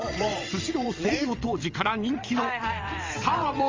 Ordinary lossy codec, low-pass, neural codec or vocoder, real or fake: Opus, 32 kbps; 7.2 kHz; vocoder, 22.05 kHz, 80 mel bands, WaveNeXt; fake